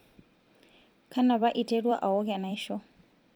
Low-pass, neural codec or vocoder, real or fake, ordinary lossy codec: 19.8 kHz; vocoder, 44.1 kHz, 128 mel bands every 256 samples, BigVGAN v2; fake; MP3, 96 kbps